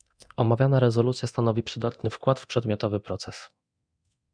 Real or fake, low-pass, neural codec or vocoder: fake; 9.9 kHz; codec, 24 kHz, 0.9 kbps, DualCodec